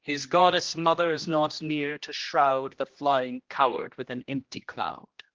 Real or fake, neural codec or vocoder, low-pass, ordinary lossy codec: fake; codec, 16 kHz, 1 kbps, X-Codec, HuBERT features, trained on general audio; 7.2 kHz; Opus, 16 kbps